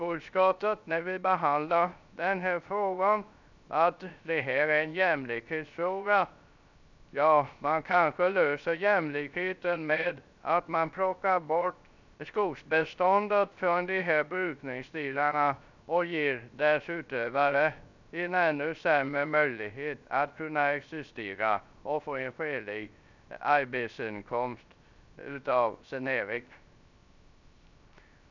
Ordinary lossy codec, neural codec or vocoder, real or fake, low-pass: none; codec, 16 kHz, 0.3 kbps, FocalCodec; fake; 7.2 kHz